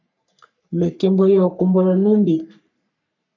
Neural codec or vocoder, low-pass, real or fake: codec, 44.1 kHz, 3.4 kbps, Pupu-Codec; 7.2 kHz; fake